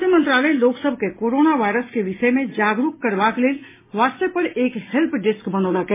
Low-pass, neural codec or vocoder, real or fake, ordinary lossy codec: 3.6 kHz; none; real; MP3, 16 kbps